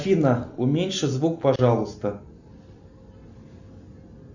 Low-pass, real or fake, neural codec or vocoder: 7.2 kHz; real; none